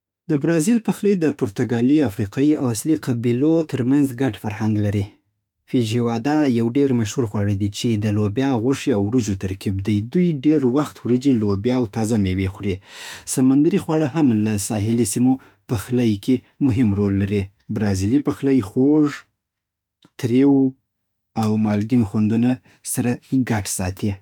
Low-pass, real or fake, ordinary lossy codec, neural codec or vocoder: 19.8 kHz; fake; none; autoencoder, 48 kHz, 32 numbers a frame, DAC-VAE, trained on Japanese speech